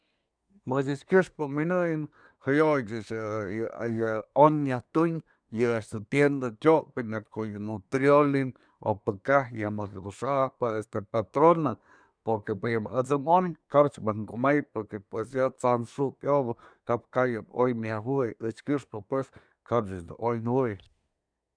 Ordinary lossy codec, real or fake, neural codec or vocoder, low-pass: none; fake; codec, 24 kHz, 1 kbps, SNAC; 9.9 kHz